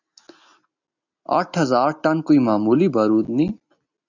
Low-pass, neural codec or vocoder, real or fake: 7.2 kHz; none; real